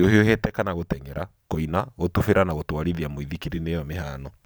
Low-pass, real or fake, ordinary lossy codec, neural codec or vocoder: none; fake; none; vocoder, 44.1 kHz, 128 mel bands every 512 samples, BigVGAN v2